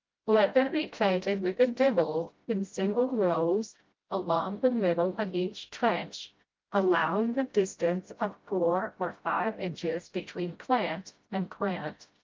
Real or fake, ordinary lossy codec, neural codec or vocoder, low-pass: fake; Opus, 24 kbps; codec, 16 kHz, 0.5 kbps, FreqCodec, smaller model; 7.2 kHz